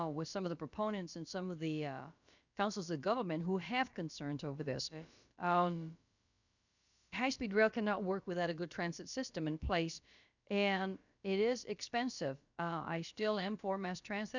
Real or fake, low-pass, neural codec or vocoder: fake; 7.2 kHz; codec, 16 kHz, about 1 kbps, DyCAST, with the encoder's durations